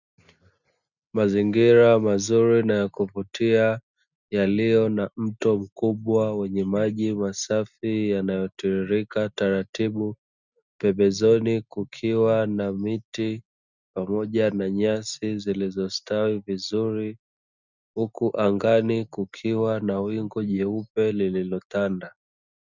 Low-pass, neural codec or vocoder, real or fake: 7.2 kHz; none; real